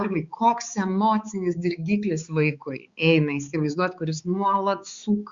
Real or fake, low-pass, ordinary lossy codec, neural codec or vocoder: fake; 7.2 kHz; Opus, 64 kbps; codec, 16 kHz, 4 kbps, X-Codec, HuBERT features, trained on balanced general audio